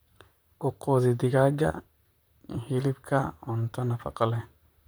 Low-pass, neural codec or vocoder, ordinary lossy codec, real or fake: none; vocoder, 44.1 kHz, 128 mel bands, Pupu-Vocoder; none; fake